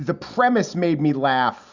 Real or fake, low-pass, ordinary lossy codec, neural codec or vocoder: real; 7.2 kHz; Opus, 64 kbps; none